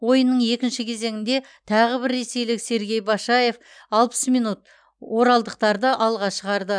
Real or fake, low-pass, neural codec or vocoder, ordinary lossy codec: real; 9.9 kHz; none; none